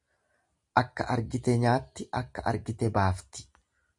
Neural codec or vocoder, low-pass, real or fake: none; 10.8 kHz; real